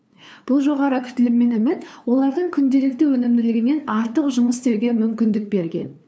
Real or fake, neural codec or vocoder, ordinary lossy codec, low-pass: fake; codec, 16 kHz, 2 kbps, FunCodec, trained on LibriTTS, 25 frames a second; none; none